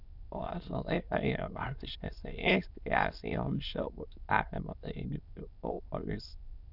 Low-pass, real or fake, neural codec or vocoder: 5.4 kHz; fake; autoencoder, 22.05 kHz, a latent of 192 numbers a frame, VITS, trained on many speakers